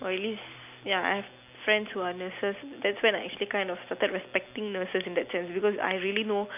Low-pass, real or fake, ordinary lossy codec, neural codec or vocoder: 3.6 kHz; real; none; none